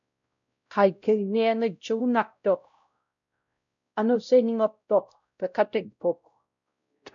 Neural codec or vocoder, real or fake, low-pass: codec, 16 kHz, 0.5 kbps, X-Codec, WavLM features, trained on Multilingual LibriSpeech; fake; 7.2 kHz